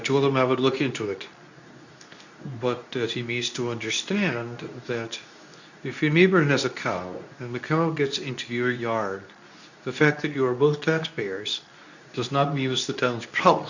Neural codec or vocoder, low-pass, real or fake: codec, 24 kHz, 0.9 kbps, WavTokenizer, medium speech release version 2; 7.2 kHz; fake